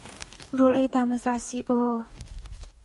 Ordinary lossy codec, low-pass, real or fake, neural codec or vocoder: MP3, 48 kbps; 14.4 kHz; fake; codec, 44.1 kHz, 2.6 kbps, DAC